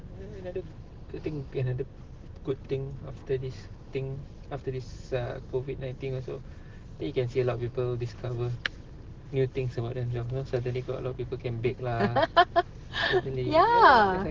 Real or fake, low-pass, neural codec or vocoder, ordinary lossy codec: real; 7.2 kHz; none; Opus, 16 kbps